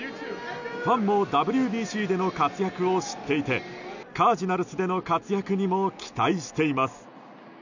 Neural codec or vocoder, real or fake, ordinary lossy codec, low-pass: none; real; none; 7.2 kHz